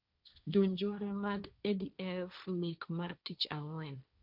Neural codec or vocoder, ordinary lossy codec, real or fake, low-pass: codec, 16 kHz, 1.1 kbps, Voila-Tokenizer; none; fake; 5.4 kHz